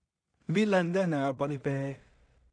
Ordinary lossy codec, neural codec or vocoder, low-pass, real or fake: none; codec, 16 kHz in and 24 kHz out, 0.4 kbps, LongCat-Audio-Codec, two codebook decoder; 9.9 kHz; fake